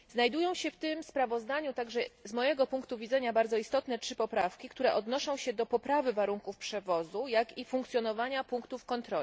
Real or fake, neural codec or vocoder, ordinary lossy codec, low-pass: real; none; none; none